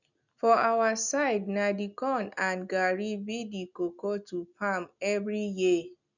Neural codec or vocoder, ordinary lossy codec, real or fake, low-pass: none; none; real; 7.2 kHz